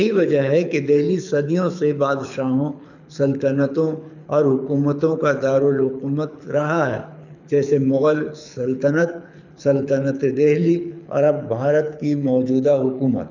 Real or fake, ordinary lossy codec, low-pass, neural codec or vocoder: fake; none; 7.2 kHz; codec, 24 kHz, 6 kbps, HILCodec